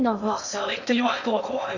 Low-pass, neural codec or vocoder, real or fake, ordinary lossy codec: 7.2 kHz; codec, 16 kHz in and 24 kHz out, 0.6 kbps, FocalCodec, streaming, 2048 codes; fake; none